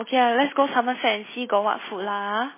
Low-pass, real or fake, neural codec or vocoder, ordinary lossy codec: 3.6 kHz; real; none; MP3, 16 kbps